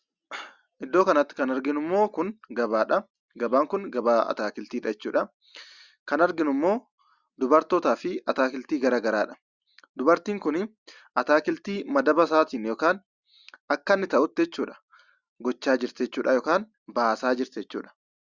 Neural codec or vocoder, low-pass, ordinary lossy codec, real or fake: none; 7.2 kHz; Opus, 64 kbps; real